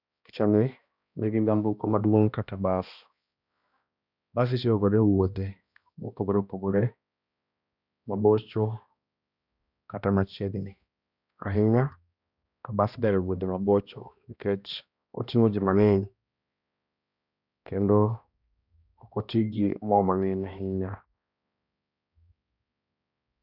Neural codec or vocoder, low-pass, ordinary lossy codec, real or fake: codec, 16 kHz, 1 kbps, X-Codec, HuBERT features, trained on balanced general audio; 5.4 kHz; none; fake